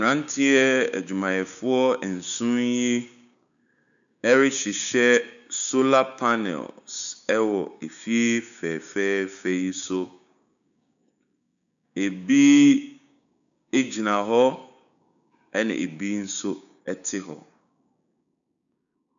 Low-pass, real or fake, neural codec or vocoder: 7.2 kHz; fake; codec, 16 kHz, 6 kbps, DAC